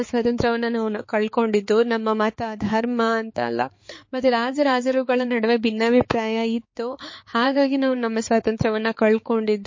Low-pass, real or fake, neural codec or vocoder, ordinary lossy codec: 7.2 kHz; fake; codec, 16 kHz, 4 kbps, X-Codec, HuBERT features, trained on balanced general audio; MP3, 32 kbps